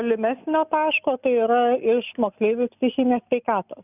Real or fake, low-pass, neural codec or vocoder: real; 3.6 kHz; none